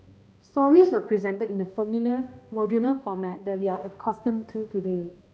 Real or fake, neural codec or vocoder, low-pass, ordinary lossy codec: fake; codec, 16 kHz, 1 kbps, X-Codec, HuBERT features, trained on balanced general audio; none; none